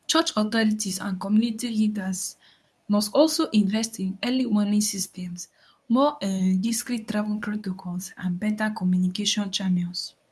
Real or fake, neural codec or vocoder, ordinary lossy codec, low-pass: fake; codec, 24 kHz, 0.9 kbps, WavTokenizer, medium speech release version 2; none; none